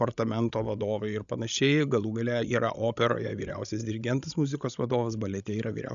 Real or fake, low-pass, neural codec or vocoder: fake; 7.2 kHz; codec, 16 kHz, 16 kbps, FreqCodec, larger model